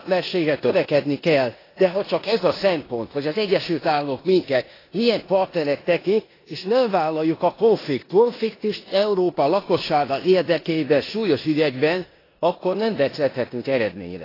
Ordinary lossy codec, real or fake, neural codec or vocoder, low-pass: AAC, 24 kbps; fake; codec, 16 kHz in and 24 kHz out, 0.9 kbps, LongCat-Audio-Codec, four codebook decoder; 5.4 kHz